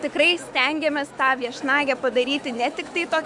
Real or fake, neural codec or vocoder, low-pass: fake; autoencoder, 48 kHz, 128 numbers a frame, DAC-VAE, trained on Japanese speech; 10.8 kHz